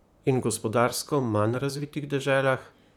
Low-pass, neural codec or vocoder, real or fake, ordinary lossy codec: 19.8 kHz; vocoder, 44.1 kHz, 128 mel bands, Pupu-Vocoder; fake; none